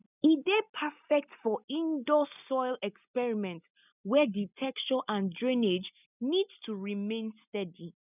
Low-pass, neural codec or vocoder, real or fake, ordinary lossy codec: 3.6 kHz; none; real; none